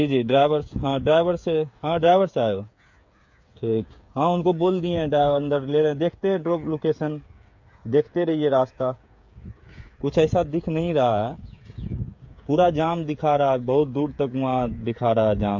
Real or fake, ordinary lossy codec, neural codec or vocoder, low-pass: fake; MP3, 48 kbps; codec, 16 kHz, 8 kbps, FreqCodec, smaller model; 7.2 kHz